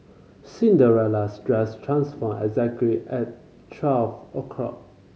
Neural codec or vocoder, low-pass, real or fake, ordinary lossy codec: none; none; real; none